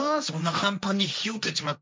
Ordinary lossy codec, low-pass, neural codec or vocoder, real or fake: none; none; codec, 16 kHz, 1.1 kbps, Voila-Tokenizer; fake